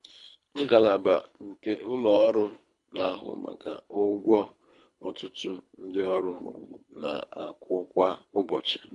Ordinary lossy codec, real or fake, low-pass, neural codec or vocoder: AAC, 64 kbps; fake; 10.8 kHz; codec, 24 kHz, 3 kbps, HILCodec